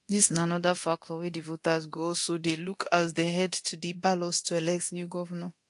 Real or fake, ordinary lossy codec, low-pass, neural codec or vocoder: fake; AAC, 48 kbps; 10.8 kHz; codec, 24 kHz, 0.9 kbps, DualCodec